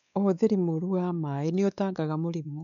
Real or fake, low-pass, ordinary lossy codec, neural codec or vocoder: fake; 7.2 kHz; none; codec, 16 kHz, 4 kbps, X-Codec, WavLM features, trained on Multilingual LibriSpeech